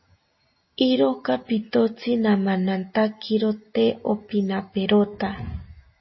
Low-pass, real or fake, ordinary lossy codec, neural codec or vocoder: 7.2 kHz; real; MP3, 24 kbps; none